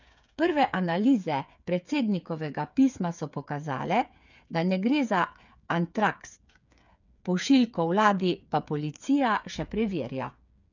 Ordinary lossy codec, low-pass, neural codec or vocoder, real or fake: none; 7.2 kHz; codec, 16 kHz, 8 kbps, FreqCodec, smaller model; fake